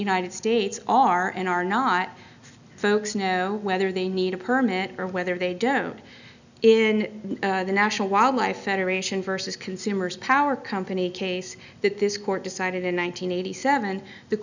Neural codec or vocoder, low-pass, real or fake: none; 7.2 kHz; real